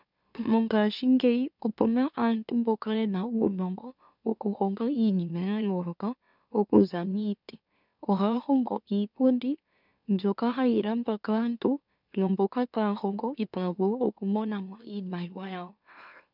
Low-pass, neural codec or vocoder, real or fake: 5.4 kHz; autoencoder, 44.1 kHz, a latent of 192 numbers a frame, MeloTTS; fake